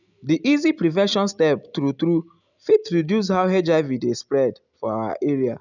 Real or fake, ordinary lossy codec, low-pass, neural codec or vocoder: real; none; 7.2 kHz; none